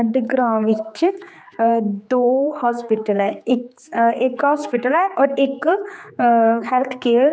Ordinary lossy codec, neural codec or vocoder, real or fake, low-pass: none; codec, 16 kHz, 4 kbps, X-Codec, HuBERT features, trained on general audio; fake; none